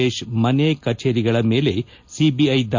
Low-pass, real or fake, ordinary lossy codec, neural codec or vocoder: 7.2 kHz; real; MP3, 48 kbps; none